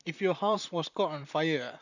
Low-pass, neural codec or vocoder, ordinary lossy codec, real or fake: 7.2 kHz; vocoder, 44.1 kHz, 128 mel bands, Pupu-Vocoder; none; fake